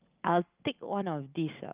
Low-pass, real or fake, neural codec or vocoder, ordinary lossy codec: 3.6 kHz; real; none; Opus, 24 kbps